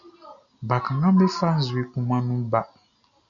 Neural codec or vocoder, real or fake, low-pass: none; real; 7.2 kHz